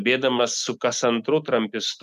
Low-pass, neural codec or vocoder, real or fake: 14.4 kHz; none; real